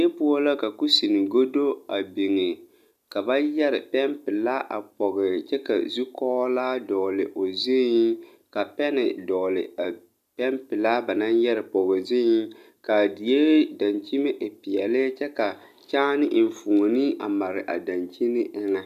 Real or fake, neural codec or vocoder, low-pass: real; none; 14.4 kHz